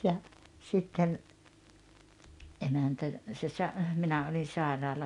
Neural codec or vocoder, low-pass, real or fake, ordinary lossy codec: none; 10.8 kHz; real; none